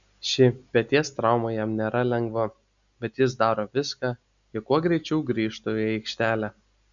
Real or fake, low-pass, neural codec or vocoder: real; 7.2 kHz; none